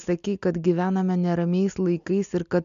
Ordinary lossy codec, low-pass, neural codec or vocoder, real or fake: MP3, 64 kbps; 7.2 kHz; none; real